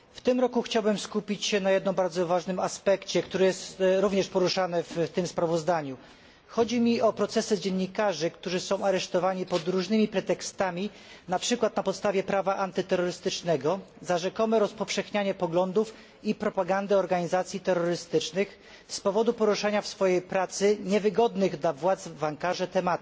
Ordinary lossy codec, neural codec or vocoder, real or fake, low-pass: none; none; real; none